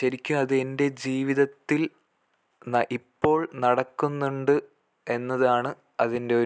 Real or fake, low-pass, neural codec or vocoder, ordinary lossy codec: real; none; none; none